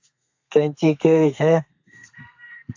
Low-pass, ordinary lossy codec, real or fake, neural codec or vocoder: 7.2 kHz; AAC, 48 kbps; fake; codec, 32 kHz, 1.9 kbps, SNAC